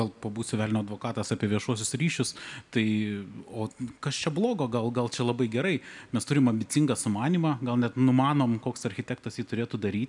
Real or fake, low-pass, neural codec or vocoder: real; 10.8 kHz; none